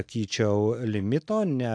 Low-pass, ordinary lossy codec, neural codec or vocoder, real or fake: 9.9 kHz; AAC, 64 kbps; none; real